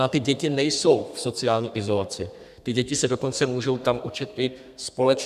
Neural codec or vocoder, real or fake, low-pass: codec, 32 kHz, 1.9 kbps, SNAC; fake; 14.4 kHz